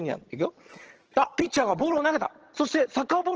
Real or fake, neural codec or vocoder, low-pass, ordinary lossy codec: fake; vocoder, 22.05 kHz, 80 mel bands, HiFi-GAN; 7.2 kHz; Opus, 16 kbps